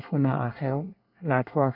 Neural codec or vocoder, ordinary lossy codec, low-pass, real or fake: codec, 24 kHz, 1 kbps, SNAC; none; 5.4 kHz; fake